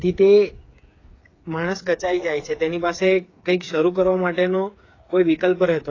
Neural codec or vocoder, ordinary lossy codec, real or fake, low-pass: codec, 16 kHz, 8 kbps, FreqCodec, smaller model; AAC, 32 kbps; fake; 7.2 kHz